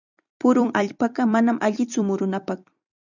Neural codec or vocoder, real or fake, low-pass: vocoder, 44.1 kHz, 128 mel bands every 256 samples, BigVGAN v2; fake; 7.2 kHz